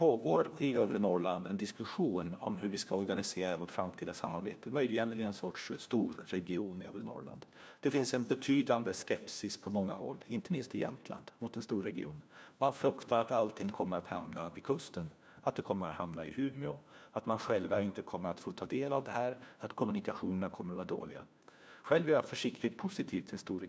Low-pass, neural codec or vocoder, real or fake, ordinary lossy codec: none; codec, 16 kHz, 1 kbps, FunCodec, trained on LibriTTS, 50 frames a second; fake; none